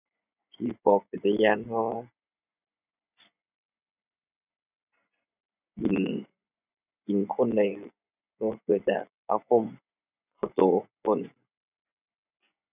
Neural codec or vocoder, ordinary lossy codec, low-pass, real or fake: vocoder, 24 kHz, 100 mel bands, Vocos; none; 3.6 kHz; fake